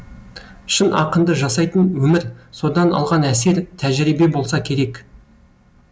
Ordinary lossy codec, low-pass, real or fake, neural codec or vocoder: none; none; real; none